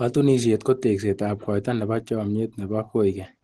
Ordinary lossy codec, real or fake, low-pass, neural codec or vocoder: Opus, 16 kbps; real; 10.8 kHz; none